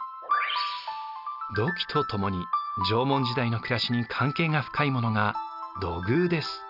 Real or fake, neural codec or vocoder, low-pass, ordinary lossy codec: real; none; 5.4 kHz; none